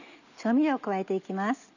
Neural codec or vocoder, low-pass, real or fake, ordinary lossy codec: none; 7.2 kHz; real; none